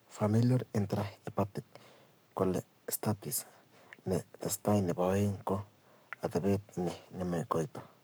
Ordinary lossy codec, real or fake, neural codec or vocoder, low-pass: none; fake; codec, 44.1 kHz, 7.8 kbps, Pupu-Codec; none